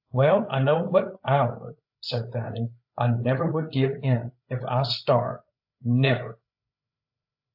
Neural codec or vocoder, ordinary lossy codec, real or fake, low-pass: codec, 16 kHz, 8 kbps, FreqCodec, larger model; AAC, 48 kbps; fake; 5.4 kHz